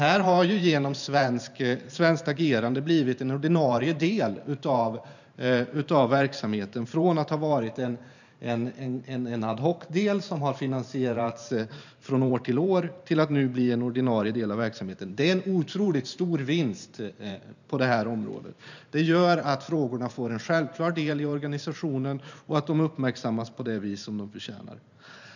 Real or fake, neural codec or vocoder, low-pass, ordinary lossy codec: fake; vocoder, 44.1 kHz, 128 mel bands every 512 samples, BigVGAN v2; 7.2 kHz; none